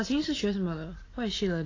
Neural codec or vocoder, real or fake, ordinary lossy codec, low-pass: none; real; AAC, 32 kbps; 7.2 kHz